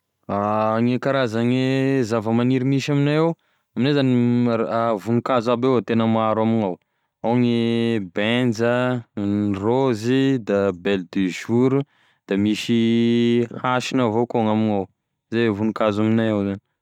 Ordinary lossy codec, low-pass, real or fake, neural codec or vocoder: none; 19.8 kHz; real; none